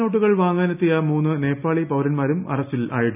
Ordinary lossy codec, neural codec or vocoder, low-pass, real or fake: none; none; 3.6 kHz; real